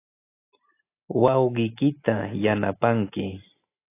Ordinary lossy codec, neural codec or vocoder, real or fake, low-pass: AAC, 24 kbps; none; real; 3.6 kHz